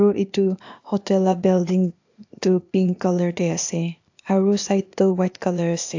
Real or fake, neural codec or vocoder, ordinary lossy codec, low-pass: fake; codec, 16 kHz, 0.8 kbps, ZipCodec; AAC, 48 kbps; 7.2 kHz